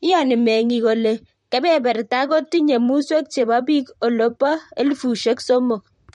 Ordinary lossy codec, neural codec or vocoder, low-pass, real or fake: MP3, 48 kbps; vocoder, 44.1 kHz, 128 mel bands, Pupu-Vocoder; 19.8 kHz; fake